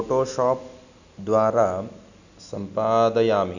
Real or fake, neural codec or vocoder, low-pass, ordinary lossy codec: real; none; 7.2 kHz; none